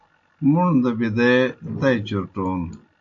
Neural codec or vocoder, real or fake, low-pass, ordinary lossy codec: none; real; 7.2 kHz; AAC, 64 kbps